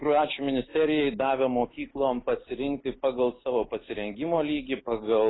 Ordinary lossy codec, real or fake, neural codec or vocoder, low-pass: AAC, 16 kbps; real; none; 7.2 kHz